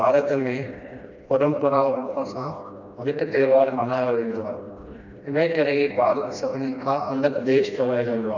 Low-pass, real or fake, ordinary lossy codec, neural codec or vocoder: 7.2 kHz; fake; none; codec, 16 kHz, 1 kbps, FreqCodec, smaller model